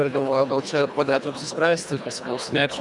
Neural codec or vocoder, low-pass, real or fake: codec, 24 kHz, 1.5 kbps, HILCodec; 10.8 kHz; fake